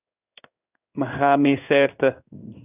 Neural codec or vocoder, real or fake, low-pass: codec, 16 kHz in and 24 kHz out, 1 kbps, XY-Tokenizer; fake; 3.6 kHz